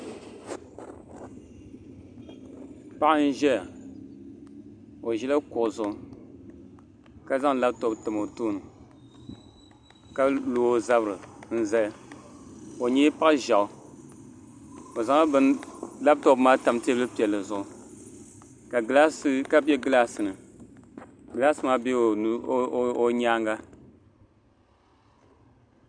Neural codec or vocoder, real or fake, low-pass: none; real; 9.9 kHz